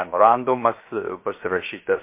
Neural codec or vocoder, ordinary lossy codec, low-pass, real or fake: codec, 16 kHz, 0.7 kbps, FocalCodec; MP3, 24 kbps; 3.6 kHz; fake